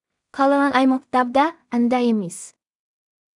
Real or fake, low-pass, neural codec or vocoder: fake; 10.8 kHz; codec, 16 kHz in and 24 kHz out, 0.4 kbps, LongCat-Audio-Codec, two codebook decoder